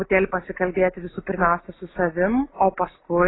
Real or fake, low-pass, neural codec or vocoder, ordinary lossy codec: real; 7.2 kHz; none; AAC, 16 kbps